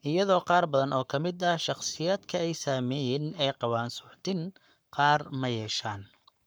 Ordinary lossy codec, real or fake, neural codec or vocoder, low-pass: none; fake; codec, 44.1 kHz, 7.8 kbps, Pupu-Codec; none